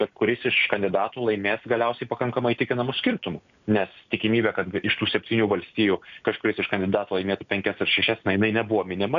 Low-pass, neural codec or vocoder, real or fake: 7.2 kHz; none; real